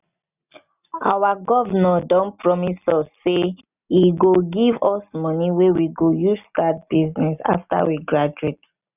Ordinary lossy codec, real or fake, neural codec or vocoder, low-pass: none; real; none; 3.6 kHz